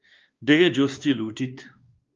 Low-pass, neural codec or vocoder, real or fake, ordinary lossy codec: 7.2 kHz; codec, 16 kHz, 2 kbps, X-Codec, WavLM features, trained on Multilingual LibriSpeech; fake; Opus, 32 kbps